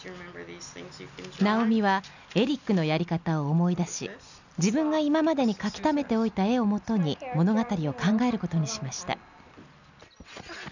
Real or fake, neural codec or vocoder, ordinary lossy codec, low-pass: real; none; none; 7.2 kHz